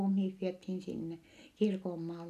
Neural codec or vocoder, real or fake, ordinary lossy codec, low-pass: none; real; none; 14.4 kHz